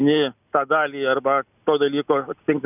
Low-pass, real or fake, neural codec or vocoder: 3.6 kHz; real; none